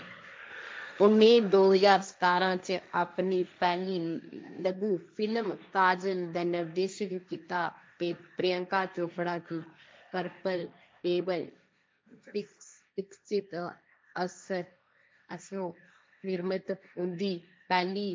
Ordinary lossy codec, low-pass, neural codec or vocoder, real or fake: none; none; codec, 16 kHz, 1.1 kbps, Voila-Tokenizer; fake